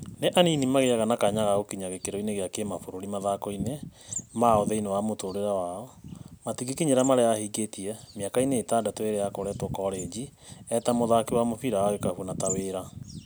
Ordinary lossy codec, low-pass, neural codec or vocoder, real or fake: none; none; none; real